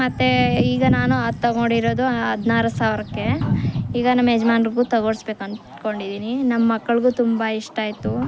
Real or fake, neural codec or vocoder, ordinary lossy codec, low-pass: real; none; none; none